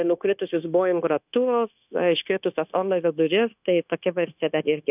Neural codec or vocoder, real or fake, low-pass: codec, 16 kHz, 0.9 kbps, LongCat-Audio-Codec; fake; 3.6 kHz